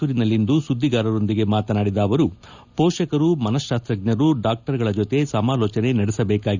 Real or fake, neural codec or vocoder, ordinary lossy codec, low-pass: real; none; none; 7.2 kHz